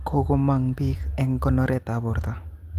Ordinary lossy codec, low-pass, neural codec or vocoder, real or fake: Opus, 32 kbps; 14.4 kHz; none; real